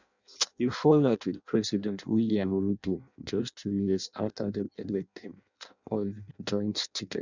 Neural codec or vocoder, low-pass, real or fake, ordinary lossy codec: codec, 16 kHz in and 24 kHz out, 0.6 kbps, FireRedTTS-2 codec; 7.2 kHz; fake; none